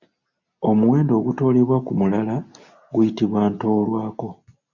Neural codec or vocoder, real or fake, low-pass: none; real; 7.2 kHz